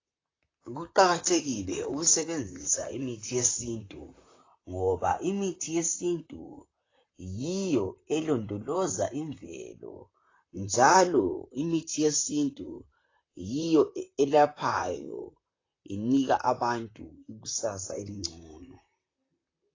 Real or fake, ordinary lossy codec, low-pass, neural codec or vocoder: fake; AAC, 32 kbps; 7.2 kHz; vocoder, 44.1 kHz, 128 mel bands, Pupu-Vocoder